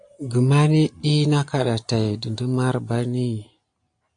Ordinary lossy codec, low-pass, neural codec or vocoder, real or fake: AAC, 64 kbps; 9.9 kHz; none; real